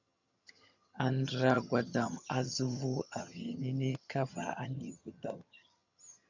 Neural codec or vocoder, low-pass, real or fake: vocoder, 22.05 kHz, 80 mel bands, HiFi-GAN; 7.2 kHz; fake